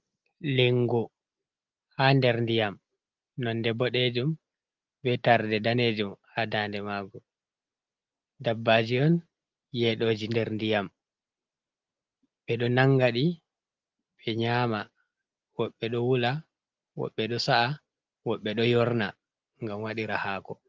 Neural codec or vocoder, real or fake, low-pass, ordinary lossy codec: none; real; 7.2 kHz; Opus, 32 kbps